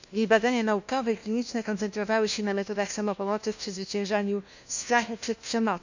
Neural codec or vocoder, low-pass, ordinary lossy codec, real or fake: codec, 16 kHz, 1 kbps, FunCodec, trained on LibriTTS, 50 frames a second; 7.2 kHz; none; fake